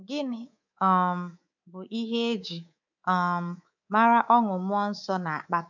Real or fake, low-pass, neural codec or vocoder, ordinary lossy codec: fake; 7.2 kHz; codec, 24 kHz, 3.1 kbps, DualCodec; none